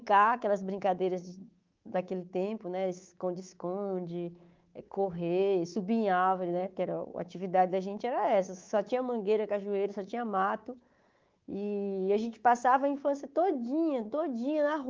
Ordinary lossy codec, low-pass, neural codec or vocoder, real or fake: Opus, 32 kbps; 7.2 kHz; codec, 24 kHz, 3.1 kbps, DualCodec; fake